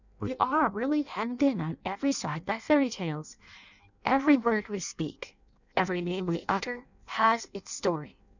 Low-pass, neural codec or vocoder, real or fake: 7.2 kHz; codec, 16 kHz in and 24 kHz out, 0.6 kbps, FireRedTTS-2 codec; fake